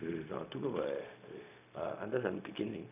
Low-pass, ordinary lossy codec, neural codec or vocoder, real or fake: 3.6 kHz; none; codec, 16 kHz, 0.4 kbps, LongCat-Audio-Codec; fake